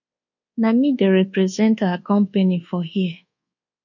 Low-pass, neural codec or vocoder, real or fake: 7.2 kHz; codec, 24 kHz, 1.2 kbps, DualCodec; fake